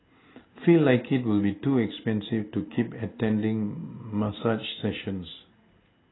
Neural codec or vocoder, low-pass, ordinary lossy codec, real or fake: none; 7.2 kHz; AAC, 16 kbps; real